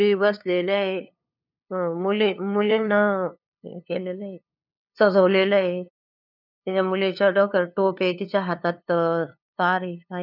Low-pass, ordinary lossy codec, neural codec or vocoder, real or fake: 5.4 kHz; none; codec, 16 kHz, 2 kbps, FunCodec, trained on LibriTTS, 25 frames a second; fake